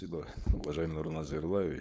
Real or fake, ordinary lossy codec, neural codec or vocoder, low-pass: fake; none; codec, 16 kHz, 4.8 kbps, FACodec; none